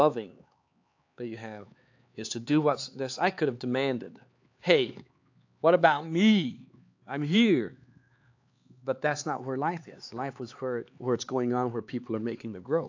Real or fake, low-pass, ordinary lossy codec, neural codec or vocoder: fake; 7.2 kHz; AAC, 48 kbps; codec, 16 kHz, 4 kbps, X-Codec, HuBERT features, trained on LibriSpeech